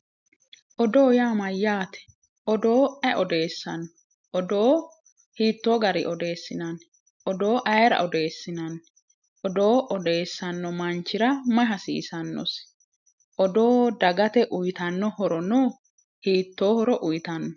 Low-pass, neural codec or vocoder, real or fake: 7.2 kHz; none; real